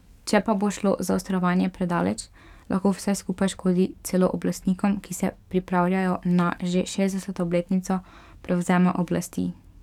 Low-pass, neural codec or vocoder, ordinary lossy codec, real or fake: 19.8 kHz; codec, 44.1 kHz, 7.8 kbps, DAC; none; fake